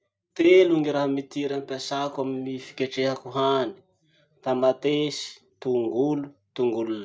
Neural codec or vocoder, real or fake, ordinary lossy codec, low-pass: none; real; none; none